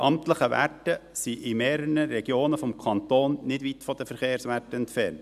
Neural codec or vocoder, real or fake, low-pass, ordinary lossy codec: none; real; 14.4 kHz; none